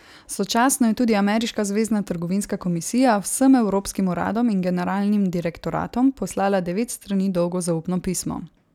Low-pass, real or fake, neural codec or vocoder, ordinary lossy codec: 19.8 kHz; real; none; none